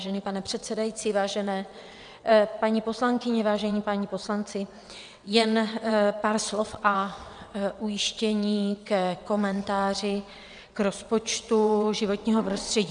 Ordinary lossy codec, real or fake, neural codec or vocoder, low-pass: MP3, 96 kbps; fake; vocoder, 22.05 kHz, 80 mel bands, WaveNeXt; 9.9 kHz